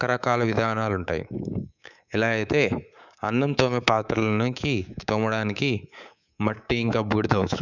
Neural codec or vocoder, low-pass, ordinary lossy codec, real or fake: codec, 24 kHz, 3.1 kbps, DualCodec; 7.2 kHz; none; fake